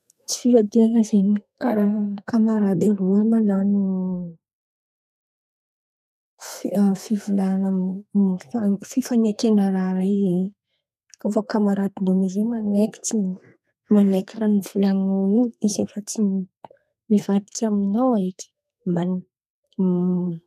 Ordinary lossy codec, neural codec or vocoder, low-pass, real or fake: none; codec, 32 kHz, 1.9 kbps, SNAC; 14.4 kHz; fake